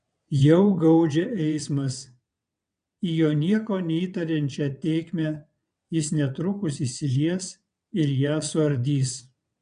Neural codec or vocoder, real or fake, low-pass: vocoder, 22.05 kHz, 80 mel bands, WaveNeXt; fake; 9.9 kHz